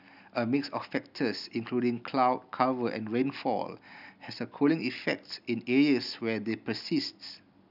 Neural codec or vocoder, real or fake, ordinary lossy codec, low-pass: none; real; none; 5.4 kHz